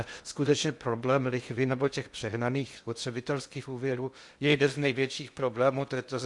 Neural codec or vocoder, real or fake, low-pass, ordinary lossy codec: codec, 16 kHz in and 24 kHz out, 0.6 kbps, FocalCodec, streaming, 2048 codes; fake; 10.8 kHz; Opus, 64 kbps